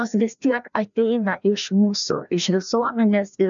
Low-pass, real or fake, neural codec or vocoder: 7.2 kHz; fake; codec, 16 kHz, 1 kbps, FreqCodec, larger model